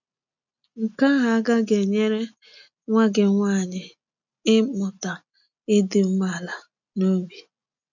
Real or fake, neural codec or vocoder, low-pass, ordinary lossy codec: real; none; 7.2 kHz; none